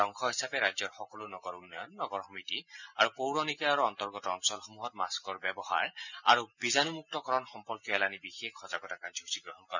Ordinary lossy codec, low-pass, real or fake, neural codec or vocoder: none; 7.2 kHz; real; none